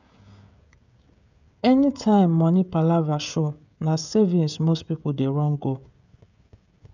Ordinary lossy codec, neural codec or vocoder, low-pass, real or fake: none; codec, 16 kHz, 16 kbps, FreqCodec, smaller model; 7.2 kHz; fake